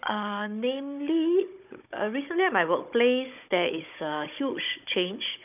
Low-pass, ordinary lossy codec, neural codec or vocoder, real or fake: 3.6 kHz; none; codec, 16 kHz, 16 kbps, FunCodec, trained on Chinese and English, 50 frames a second; fake